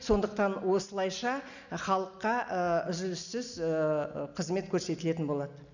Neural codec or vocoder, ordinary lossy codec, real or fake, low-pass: none; none; real; 7.2 kHz